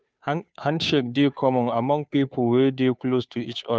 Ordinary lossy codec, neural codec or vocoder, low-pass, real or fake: none; codec, 16 kHz, 2 kbps, FunCodec, trained on Chinese and English, 25 frames a second; none; fake